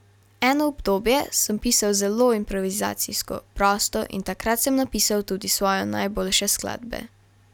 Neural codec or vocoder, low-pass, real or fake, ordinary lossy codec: none; 19.8 kHz; real; none